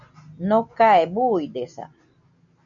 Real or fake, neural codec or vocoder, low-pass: real; none; 7.2 kHz